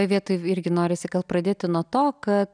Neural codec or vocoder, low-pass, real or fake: none; 9.9 kHz; real